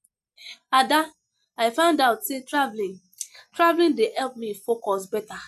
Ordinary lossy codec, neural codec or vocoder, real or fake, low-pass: none; none; real; 14.4 kHz